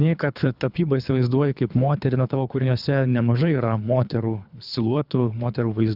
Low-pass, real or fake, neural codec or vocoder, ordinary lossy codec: 5.4 kHz; fake; codec, 24 kHz, 3 kbps, HILCodec; Opus, 64 kbps